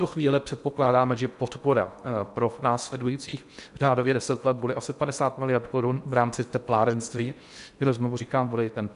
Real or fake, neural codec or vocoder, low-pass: fake; codec, 16 kHz in and 24 kHz out, 0.8 kbps, FocalCodec, streaming, 65536 codes; 10.8 kHz